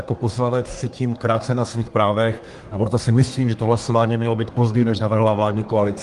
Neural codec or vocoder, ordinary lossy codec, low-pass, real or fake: codec, 24 kHz, 1 kbps, SNAC; Opus, 24 kbps; 10.8 kHz; fake